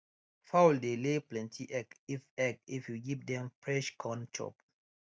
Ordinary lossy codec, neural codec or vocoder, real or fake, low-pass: none; none; real; none